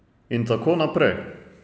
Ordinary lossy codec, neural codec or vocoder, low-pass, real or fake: none; none; none; real